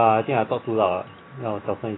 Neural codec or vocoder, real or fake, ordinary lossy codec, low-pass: none; real; AAC, 16 kbps; 7.2 kHz